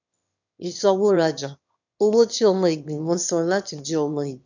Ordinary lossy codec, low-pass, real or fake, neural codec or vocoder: none; 7.2 kHz; fake; autoencoder, 22.05 kHz, a latent of 192 numbers a frame, VITS, trained on one speaker